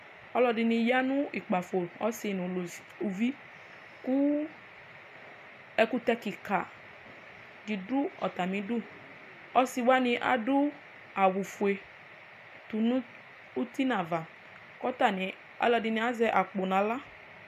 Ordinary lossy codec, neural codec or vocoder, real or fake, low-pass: MP3, 96 kbps; none; real; 14.4 kHz